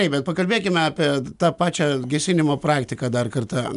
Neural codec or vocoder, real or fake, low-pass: none; real; 10.8 kHz